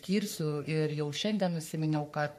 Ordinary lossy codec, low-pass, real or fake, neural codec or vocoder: MP3, 64 kbps; 14.4 kHz; fake; codec, 44.1 kHz, 3.4 kbps, Pupu-Codec